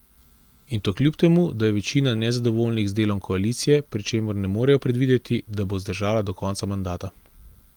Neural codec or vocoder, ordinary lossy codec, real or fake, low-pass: none; Opus, 24 kbps; real; 19.8 kHz